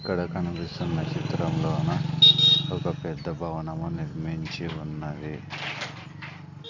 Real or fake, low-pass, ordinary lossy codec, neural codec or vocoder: real; 7.2 kHz; none; none